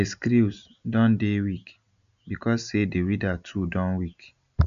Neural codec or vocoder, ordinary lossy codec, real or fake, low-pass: none; none; real; 7.2 kHz